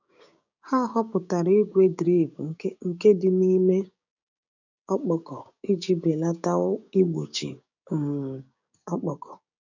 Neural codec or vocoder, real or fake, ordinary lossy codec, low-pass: codec, 16 kHz, 6 kbps, DAC; fake; none; 7.2 kHz